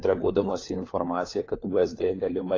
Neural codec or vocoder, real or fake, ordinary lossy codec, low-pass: codec, 16 kHz, 4 kbps, FunCodec, trained on LibriTTS, 50 frames a second; fake; AAC, 32 kbps; 7.2 kHz